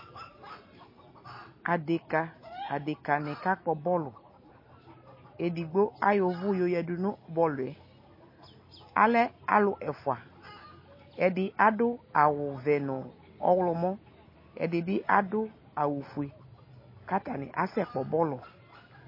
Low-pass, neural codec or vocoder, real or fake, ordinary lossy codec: 5.4 kHz; none; real; MP3, 24 kbps